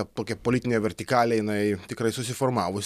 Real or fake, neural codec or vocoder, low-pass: real; none; 14.4 kHz